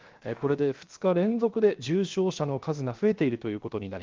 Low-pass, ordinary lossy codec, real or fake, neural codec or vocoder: 7.2 kHz; Opus, 32 kbps; fake; codec, 16 kHz, 0.7 kbps, FocalCodec